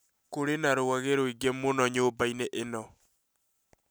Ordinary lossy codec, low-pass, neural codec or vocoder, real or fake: none; none; none; real